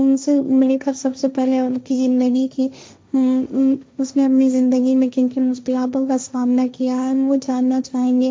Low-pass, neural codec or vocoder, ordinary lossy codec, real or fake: none; codec, 16 kHz, 1.1 kbps, Voila-Tokenizer; none; fake